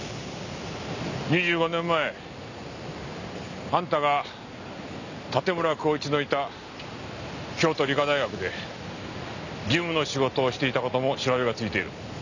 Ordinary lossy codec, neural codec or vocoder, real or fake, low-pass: none; none; real; 7.2 kHz